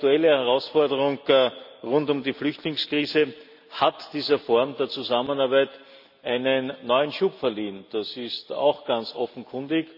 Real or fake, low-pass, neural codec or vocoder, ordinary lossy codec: real; 5.4 kHz; none; none